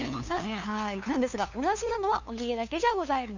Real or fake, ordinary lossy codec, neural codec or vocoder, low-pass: fake; none; codec, 16 kHz, 2 kbps, FunCodec, trained on LibriTTS, 25 frames a second; 7.2 kHz